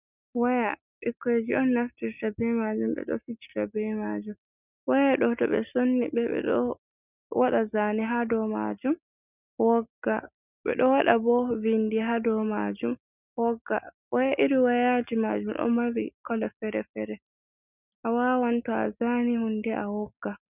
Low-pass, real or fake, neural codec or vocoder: 3.6 kHz; real; none